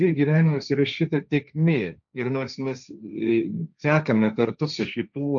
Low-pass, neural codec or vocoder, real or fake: 7.2 kHz; codec, 16 kHz, 1.1 kbps, Voila-Tokenizer; fake